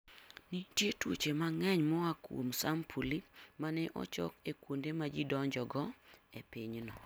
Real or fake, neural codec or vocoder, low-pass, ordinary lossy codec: real; none; none; none